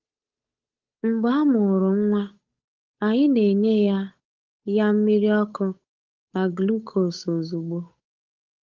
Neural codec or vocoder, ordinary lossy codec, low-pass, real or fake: codec, 16 kHz, 8 kbps, FunCodec, trained on Chinese and English, 25 frames a second; Opus, 32 kbps; 7.2 kHz; fake